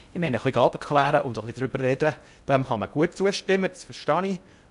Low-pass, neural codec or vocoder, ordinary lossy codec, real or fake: 10.8 kHz; codec, 16 kHz in and 24 kHz out, 0.6 kbps, FocalCodec, streaming, 2048 codes; none; fake